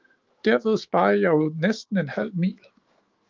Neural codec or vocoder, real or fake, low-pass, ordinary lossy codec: autoencoder, 48 kHz, 128 numbers a frame, DAC-VAE, trained on Japanese speech; fake; 7.2 kHz; Opus, 32 kbps